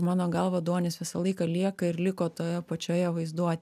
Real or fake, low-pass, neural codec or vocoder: real; 14.4 kHz; none